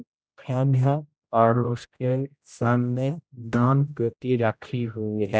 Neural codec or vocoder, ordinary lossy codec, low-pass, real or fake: codec, 16 kHz, 0.5 kbps, X-Codec, HuBERT features, trained on general audio; none; none; fake